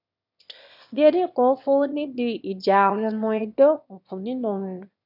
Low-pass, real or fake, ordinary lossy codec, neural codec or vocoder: 5.4 kHz; fake; none; autoencoder, 22.05 kHz, a latent of 192 numbers a frame, VITS, trained on one speaker